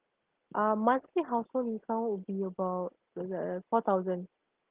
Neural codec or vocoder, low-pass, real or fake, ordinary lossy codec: none; 3.6 kHz; real; Opus, 16 kbps